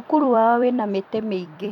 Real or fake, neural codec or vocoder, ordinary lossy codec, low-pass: fake; vocoder, 44.1 kHz, 128 mel bands every 256 samples, BigVGAN v2; none; 19.8 kHz